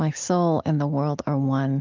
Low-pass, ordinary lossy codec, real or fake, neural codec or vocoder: 7.2 kHz; Opus, 24 kbps; real; none